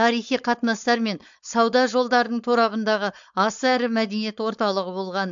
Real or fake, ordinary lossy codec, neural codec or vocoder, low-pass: fake; MP3, 64 kbps; codec, 16 kHz, 4.8 kbps, FACodec; 7.2 kHz